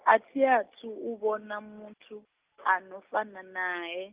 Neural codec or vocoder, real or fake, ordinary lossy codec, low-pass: none; real; Opus, 24 kbps; 3.6 kHz